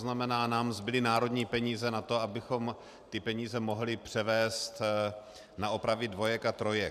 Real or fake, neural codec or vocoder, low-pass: fake; vocoder, 44.1 kHz, 128 mel bands every 512 samples, BigVGAN v2; 14.4 kHz